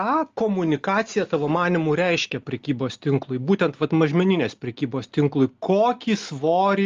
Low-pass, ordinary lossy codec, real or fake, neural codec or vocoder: 7.2 kHz; Opus, 32 kbps; real; none